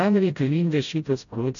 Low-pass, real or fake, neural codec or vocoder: 7.2 kHz; fake; codec, 16 kHz, 0.5 kbps, FreqCodec, smaller model